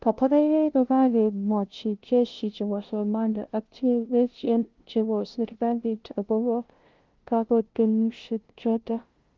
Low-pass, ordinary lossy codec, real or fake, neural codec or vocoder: 7.2 kHz; Opus, 16 kbps; fake; codec, 16 kHz, 0.5 kbps, FunCodec, trained on LibriTTS, 25 frames a second